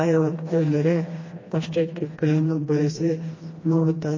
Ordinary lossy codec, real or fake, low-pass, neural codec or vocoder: MP3, 32 kbps; fake; 7.2 kHz; codec, 16 kHz, 1 kbps, FreqCodec, smaller model